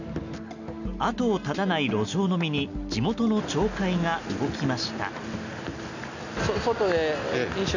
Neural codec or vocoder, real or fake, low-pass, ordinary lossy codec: none; real; 7.2 kHz; none